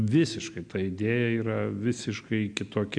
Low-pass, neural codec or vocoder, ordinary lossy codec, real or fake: 9.9 kHz; none; MP3, 64 kbps; real